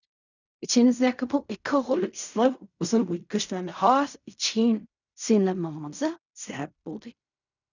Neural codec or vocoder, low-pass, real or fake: codec, 16 kHz in and 24 kHz out, 0.4 kbps, LongCat-Audio-Codec, fine tuned four codebook decoder; 7.2 kHz; fake